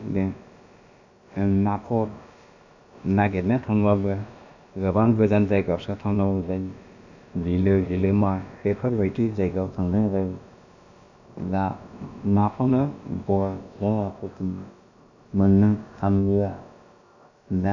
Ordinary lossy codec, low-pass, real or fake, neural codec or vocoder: none; 7.2 kHz; fake; codec, 16 kHz, about 1 kbps, DyCAST, with the encoder's durations